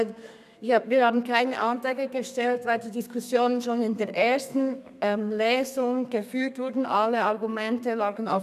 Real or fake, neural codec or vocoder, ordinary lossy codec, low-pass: fake; codec, 32 kHz, 1.9 kbps, SNAC; none; 14.4 kHz